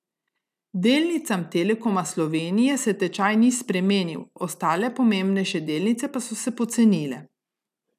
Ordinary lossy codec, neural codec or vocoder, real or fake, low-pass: none; none; real; 14.4 kHz